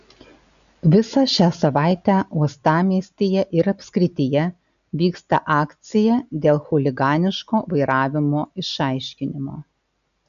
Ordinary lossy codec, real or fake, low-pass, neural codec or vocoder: AAC, 96 kbps; real; 7.2 kHz; none